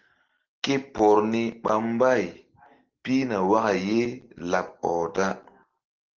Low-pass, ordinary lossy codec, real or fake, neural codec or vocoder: 7.2 kHz; Opus, 16 kbps; real; none